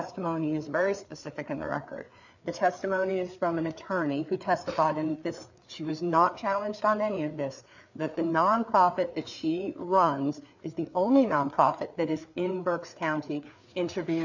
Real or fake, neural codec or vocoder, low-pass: fake; codec, 16 kHz, 4 kbps, FreqCodec, larger model; 7.2 kHz